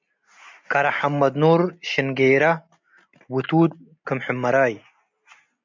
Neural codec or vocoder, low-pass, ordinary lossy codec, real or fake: none; 7.2 kHz; MP3, 64 kbps; real